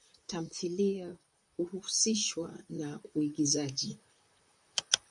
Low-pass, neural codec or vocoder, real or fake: 10.8 kHz; vocoder, 44.1 kHz, 128 mel bands, Pupu-Vocoder; fake